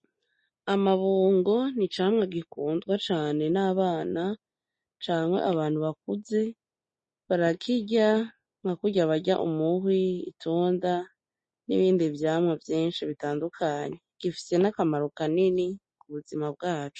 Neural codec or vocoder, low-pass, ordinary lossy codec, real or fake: none; 10.8 kHz; MP3, 32 kbps; real